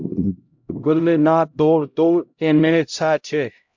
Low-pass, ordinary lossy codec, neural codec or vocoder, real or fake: 7.2 kHz; MP3, 64 kbps; codec, 16 kHz, 0.5 kbps, X-Codec, HuBERT features, trained on LibriSpeech; fake